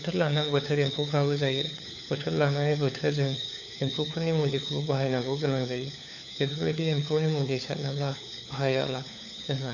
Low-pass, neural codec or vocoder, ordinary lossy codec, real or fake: 7.2 kHz; codec, 16 kHz, 4 kbps, FunCodec, trained on LibriTTS, 50 frames a second; none; fake